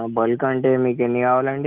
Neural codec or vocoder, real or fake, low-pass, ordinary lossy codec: none; real; 3.6 kHz; Opus, 32 kbps